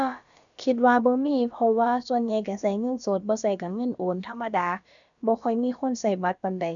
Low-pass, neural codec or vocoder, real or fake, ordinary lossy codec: 7.2 kHz; codec, 16 kHz, about 1 kbps, DyCAST, with the encoder's durations; fake; none